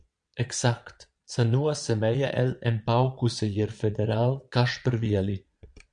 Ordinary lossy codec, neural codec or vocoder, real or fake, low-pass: MP3, 64 kbps; vocoder, 22.05 kHz, 80 mel bands, Vocos; fake; 9.9 kHz